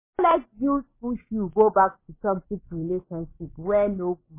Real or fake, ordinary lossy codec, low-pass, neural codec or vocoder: fake; MP3, 16 kbps; 3.6 kHz; codec, 16 kHz, 6 kbps, DAC